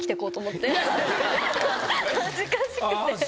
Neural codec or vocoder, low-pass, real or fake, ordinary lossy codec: none; none; real; none